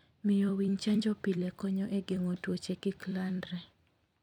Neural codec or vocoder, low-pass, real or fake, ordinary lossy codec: vocoder, 44.1 kHz, 128 mel bands every 256 samples, BigVGAN v2; 19.8 kHz; fake; none